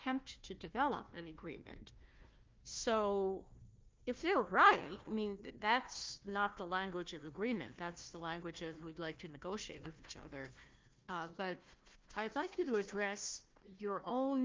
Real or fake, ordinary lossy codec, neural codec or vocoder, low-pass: fake; Opus, 32 kbps; codec, 16 kHz, 1 kbps, FunCodec, trained on Chinese and English, 50 frames a second; 7.2 kHz